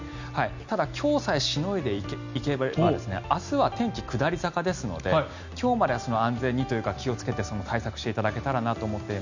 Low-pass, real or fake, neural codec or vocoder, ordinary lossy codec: 7.2 kHz; real; none; none